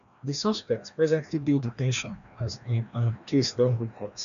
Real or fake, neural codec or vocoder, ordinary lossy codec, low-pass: fake; codec, 16 kHz, 1 kbps, FreqCodec, larger model; MP3, 96 kbps; 7.2 kHz